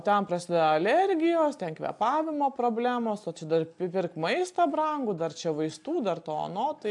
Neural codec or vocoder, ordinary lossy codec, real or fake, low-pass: none; AAC, 64 kbps; real; 10.8 kHz